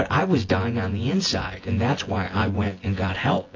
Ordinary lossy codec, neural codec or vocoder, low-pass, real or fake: AAC, 32 kbps; vocoder, 24 kHz, 100 mel bands, Vocos; 7.2 kHz; fake